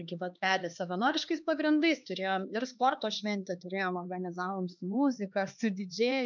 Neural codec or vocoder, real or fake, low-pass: codec, 16 kHz, 2 kbps, X-Codec, HuBERT features, trained on LibriSpeech; fake; 7.2 kHz